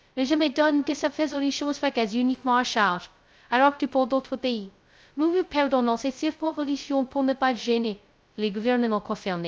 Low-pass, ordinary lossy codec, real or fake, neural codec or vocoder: none; none; fake; codec, 16 kHz, 0.2 kbps, FocalCodec